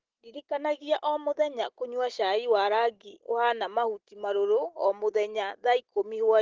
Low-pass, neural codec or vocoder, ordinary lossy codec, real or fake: 7.2 kHz; none; Opus, 16 kbps; real